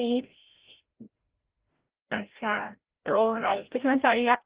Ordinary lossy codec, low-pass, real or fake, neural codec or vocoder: Opus, 16 kbps; 3.6 kHz; fake; codec, 16 kHz, 0.5 kbps, FreqCodec, larger model